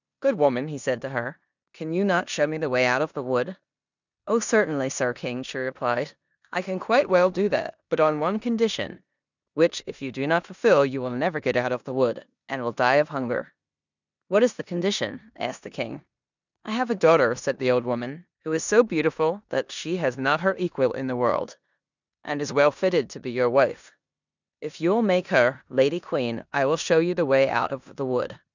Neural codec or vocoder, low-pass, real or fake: codec, 16 kHz in and 24 kHz out, 0.9 kbps, LongCat-Audio-Codec, four codebook decoder; 7.2 kHz; fake